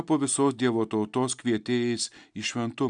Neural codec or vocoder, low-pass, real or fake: none; 9.9 kHz; real